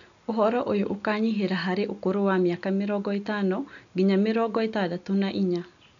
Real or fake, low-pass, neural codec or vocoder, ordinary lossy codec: real; 7.2 kHz; none; none